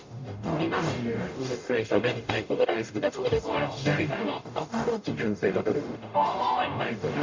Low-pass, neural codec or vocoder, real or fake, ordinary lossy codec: 7.2 kHz; codec, 44.1 kHz, 0.9 kbps, DAC; fake; MP3, 48 kbps